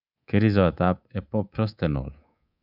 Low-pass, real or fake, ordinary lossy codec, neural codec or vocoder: 5.4 kHz; fake; none; codec, 24 kHz, 3.1 kbps, DualCodec